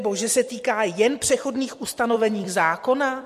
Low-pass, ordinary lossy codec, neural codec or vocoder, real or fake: 14.4 kHz; MP3, 64 kbps; vocoder, 44.1 kHz, 128 mel bands every 256 samples, BigVGAN v2; fake